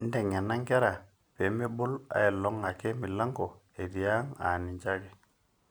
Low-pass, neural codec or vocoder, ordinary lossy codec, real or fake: none; none; none; real